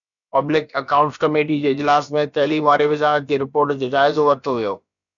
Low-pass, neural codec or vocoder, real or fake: 7.2 kHz; codec, 16 kHz, about 1 kbps, DyCAST, with the encoder's durations; fake